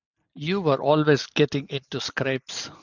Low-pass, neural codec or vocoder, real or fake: 7.2 kHz; none; real